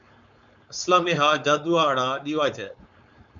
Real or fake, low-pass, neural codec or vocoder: fake; 7.2 kHz; codec, 16 kHz, 4.8 kbps, FACodec